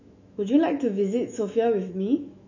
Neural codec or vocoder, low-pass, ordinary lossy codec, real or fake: autoencoder, 48 kHz, 128 numbers a frame, DAC-VAE, trained on Japanese speech; 7.2 kHz; none; fake